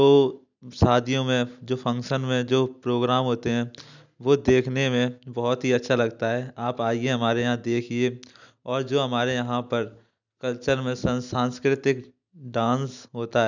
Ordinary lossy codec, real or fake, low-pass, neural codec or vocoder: none; real; 7.2 kHz; none